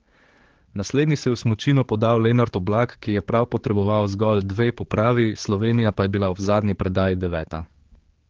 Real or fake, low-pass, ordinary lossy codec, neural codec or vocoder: fake; 7.2 kHz; Opus, 16 kbps; codec, 16 kHz, 4 kbps, X-Codec, HuBERT features, trained on general audio